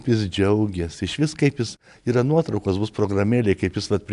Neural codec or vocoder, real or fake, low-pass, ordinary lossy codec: none; real; 10.8 kHz; MP3, 96 kbps